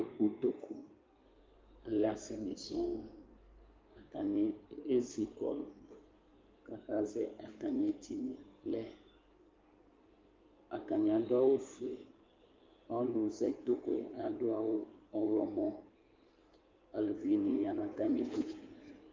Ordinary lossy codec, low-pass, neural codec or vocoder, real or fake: Opus, 24 kbps; 7.2 kHz; codec, 16 kHz in and 24 kHz out, 2.2 kbps, FireRedTTS-2 codec; fake